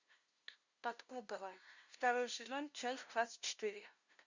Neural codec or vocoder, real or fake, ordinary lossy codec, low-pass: codec, 16 kHz, 0.5 kbps, FunCodec, trained on LibriTTS, 25 frames a second; fake; Opus, 64 kbps; 7.2 kHz